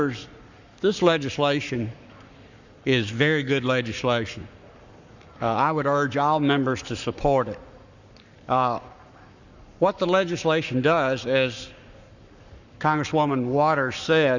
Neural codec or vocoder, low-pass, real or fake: codec, 44.1 kHz, 7.8 kbps, Pupu-Codec; 7.2 kHz; fake